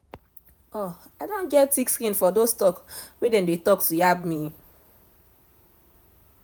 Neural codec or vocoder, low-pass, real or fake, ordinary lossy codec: vocoder, 48 kHz, 128 mel bands, Vocos; none; fake; none